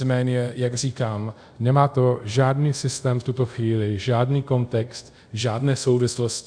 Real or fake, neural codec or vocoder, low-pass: fake; codec, 24 kHz, 0.5 kbps, DualCodec; 9.9 kHz